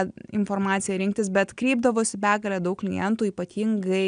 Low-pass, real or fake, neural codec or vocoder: 9.9 kHz; real; none